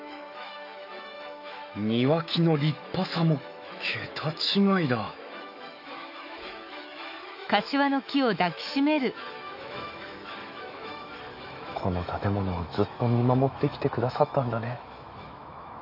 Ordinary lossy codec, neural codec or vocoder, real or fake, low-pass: none; autoencoder, 48 kHz, 128 numbers a frame, DAC-VAE, trained on Japanese speech; fake; 5.4 kHz